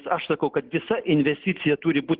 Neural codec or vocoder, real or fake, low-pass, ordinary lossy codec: none; real; 5.4 kHz; Opus, 16 kbps